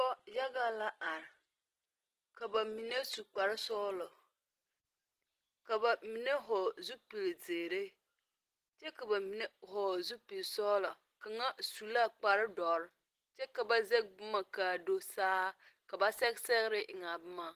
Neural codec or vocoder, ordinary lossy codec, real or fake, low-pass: none; Opus, 16 kbps; real; 14.4 kHz